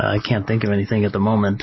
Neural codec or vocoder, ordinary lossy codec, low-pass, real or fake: none; MP3, 24 kbps; 7.2 kHz; real